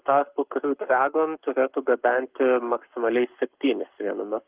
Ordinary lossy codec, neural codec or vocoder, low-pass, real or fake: Opus, 32 kbps; codec, 44.1 kHz, 7.8 kbps, Pupu-Codec; 3.6 kHz; fake